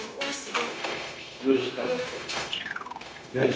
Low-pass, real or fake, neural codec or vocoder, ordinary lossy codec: none; fake; codec, 16 kHz, 0.9 kbps, LongCat-Audio-Codec; none